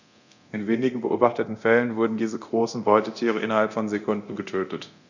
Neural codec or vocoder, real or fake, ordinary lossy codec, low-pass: codec, 24 kHz, 0.9 kbps, DualCodec; fake; none; 7.2 kHz